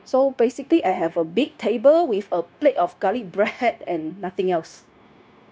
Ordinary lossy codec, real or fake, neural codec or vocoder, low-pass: none; fake; codec, 16 kHz, 0.9 kbps, LongCat-Audio-Codec; none